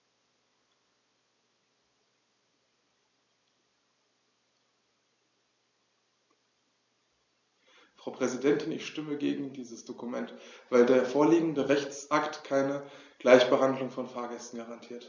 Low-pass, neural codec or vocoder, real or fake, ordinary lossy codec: 7.2 kHz; none; real; MP3, 48 kbps